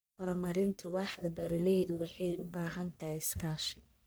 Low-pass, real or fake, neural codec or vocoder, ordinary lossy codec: none; fake; codec, 44.1 kHz, 1.7 kbps, Pupu-Codec; none